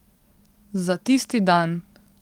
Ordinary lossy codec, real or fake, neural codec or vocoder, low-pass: Opus, 24 kbps; fake; codec, 44.1 kHz, 7.8 kbps, DAC; 19.8 kHz